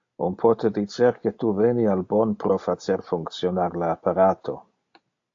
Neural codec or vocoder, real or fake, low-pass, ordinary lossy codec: none; real; 7.2 kHz; AAC, 48 kbps